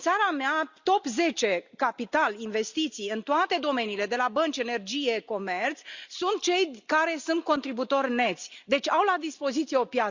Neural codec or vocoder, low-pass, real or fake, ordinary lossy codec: none; 7.2 kHz; real; Opus, 64 kbps